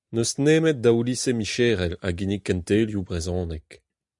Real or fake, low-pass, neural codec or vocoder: real; 10.8 kHz; none